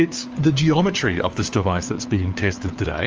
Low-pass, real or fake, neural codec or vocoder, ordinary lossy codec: 7.2 kHz; fake; codec, 16 kHz, 4 kbps, FunCodec, trained on LibriTTS, 50 frames a second; Opus, 24 kbps